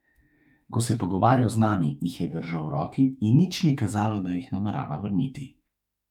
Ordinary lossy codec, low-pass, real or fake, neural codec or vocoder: none; 19.8 kHz; fake; autoencoder, 48 kHz, 32 numbers a frame, DAC-VAE, trained on Japanese speech